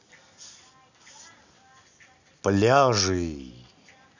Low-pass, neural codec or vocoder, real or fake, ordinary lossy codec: 7.2 kHz; none; real; none